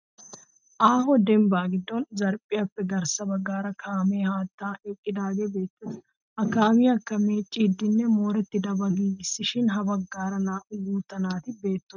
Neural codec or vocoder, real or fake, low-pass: none; real; 7.2 kHz